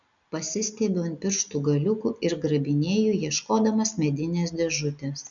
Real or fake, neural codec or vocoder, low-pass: real; none; 7.2 kHz